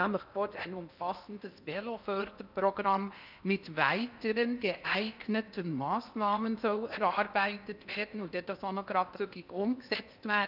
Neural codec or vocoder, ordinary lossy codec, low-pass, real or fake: codec, 16 kHz in and 24 kHz out, 0.8 kbps, FocalCodec, streaming, 65536 codes; none; 5.4 kHz; fake